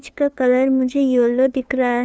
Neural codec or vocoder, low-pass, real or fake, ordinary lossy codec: codec, 16 kHz, 2 kbps, FunCodec, trained on LibriTTS, 25 frames a second; none; fake; none